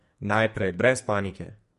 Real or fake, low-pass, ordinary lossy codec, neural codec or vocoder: fake; 14.4 kHz; MP3, 48 kbps; codec, 44.1 kHz, 2.6 kbps, SNAC